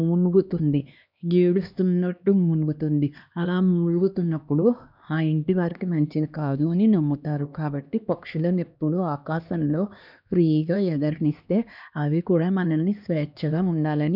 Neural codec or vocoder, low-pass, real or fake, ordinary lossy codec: codec, 16 kHz, 2 kbps, X-Codec, HuBERT features, trained on LibriSpeech; 5.4 kHz; fake; none